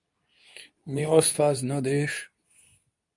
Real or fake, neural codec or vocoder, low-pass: fake; codec, 24 kHz, 0.9 kbps, WavTokenizer, medium speech release version 2; 10.8 kHz